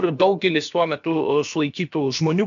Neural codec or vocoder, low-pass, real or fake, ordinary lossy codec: codec, 16 kHz, about 1 kbps, DyCAST, with the encoder's durations; 7.2 kHz; fake; AAC, 64 kbps